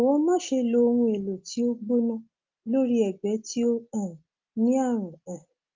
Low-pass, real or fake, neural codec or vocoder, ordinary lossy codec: 7.2 kHz; real; none; Opus, 24 kbps